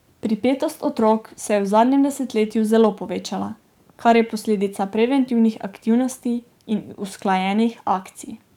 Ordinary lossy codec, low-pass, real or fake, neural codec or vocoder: none; 19.8 kHz; fake; codec, 44.1 kHz, 7.8 kbps, DAC